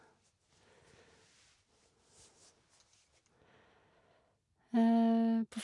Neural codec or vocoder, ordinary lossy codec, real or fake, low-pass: none; none; real; 10.8 kHz